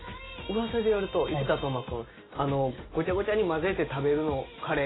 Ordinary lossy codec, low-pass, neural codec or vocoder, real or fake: AAC, 16 kbps; 7.2 kHz; none; real